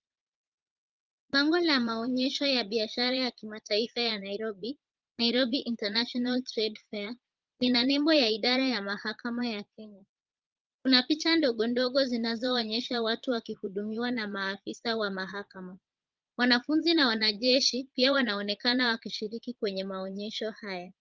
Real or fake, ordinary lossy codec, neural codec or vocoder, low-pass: fake; Opus, 32 kbps; vocoder, 22.05 kHz, 80 mel bands, Vocos; 7.2 kHz